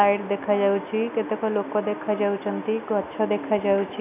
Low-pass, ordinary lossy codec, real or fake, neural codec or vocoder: 3.6 kHz; none; real; none